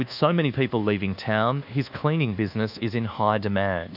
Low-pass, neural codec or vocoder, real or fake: 5.4 kHz; codec, 24 kHz, 1.2 kbps, DualCodec; fake